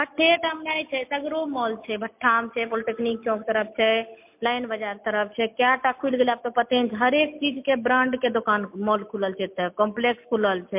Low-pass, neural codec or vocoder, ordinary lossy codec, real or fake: 3.6 kHz; none; MP3, 32 kbps; real